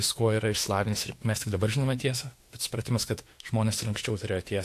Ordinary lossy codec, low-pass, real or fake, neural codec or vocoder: AAC, 64 kbps; 14.4 kHz; fake; autoencoder, 48 kHz, 32 numbers a frame, DAC-VAE, trained on Japanese speech